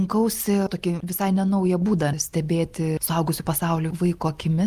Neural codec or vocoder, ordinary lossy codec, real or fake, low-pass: none; Opus, 32 kbps; real; 14.4 kHz